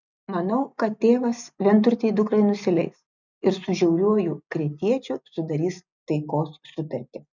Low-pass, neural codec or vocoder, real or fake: 7.2 kHz; none; real